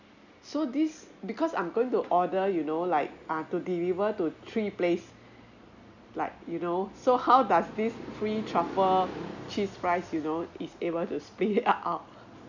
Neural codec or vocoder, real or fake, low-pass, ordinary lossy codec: none; real; 7.2 kHz; none